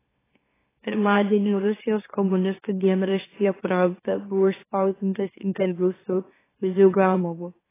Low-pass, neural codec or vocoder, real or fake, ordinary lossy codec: 3.6 kHz; autoencoder, 44.1 kHz, a latent of 192 numbers a frame, MeloTTS; fake; AAC, 16 kbps